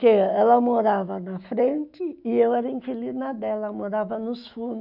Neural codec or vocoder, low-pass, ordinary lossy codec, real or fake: autoencoder, 48 kHz, 128 numbers a frame, DAC-VAE, trained on Japanese speech; 5.4 kHz; none; fake